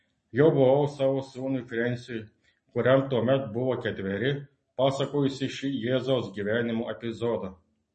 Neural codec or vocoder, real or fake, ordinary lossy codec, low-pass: none; real; MP3, 32 kbps; 10.8 kHz